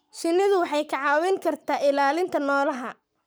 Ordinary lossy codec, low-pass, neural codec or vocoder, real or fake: none; none; codec, 44.1 kHz, 7.8 kbps, Pupu-Codec; fake